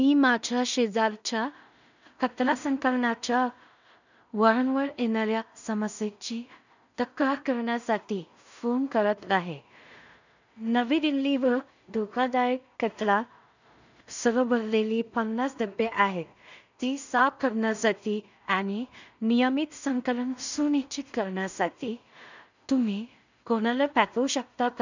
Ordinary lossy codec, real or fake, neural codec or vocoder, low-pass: none; fake; codec, 16 kHz in and 24 kHz out, 0.4 kbps, LongCat-Audio-Codec, two codebook decoder; 7.2 kHz